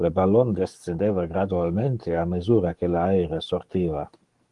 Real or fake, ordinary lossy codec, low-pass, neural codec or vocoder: fake; Opus, 24 kbps; 10.8 kHz; codec, 44.1 kHz, 7.8 kbps, DAC